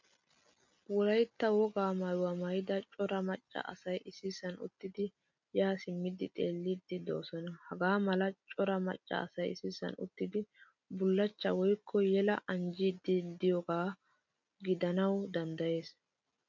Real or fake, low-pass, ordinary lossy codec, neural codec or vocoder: real; 7.2 kHz; MP3, 64 kbps; none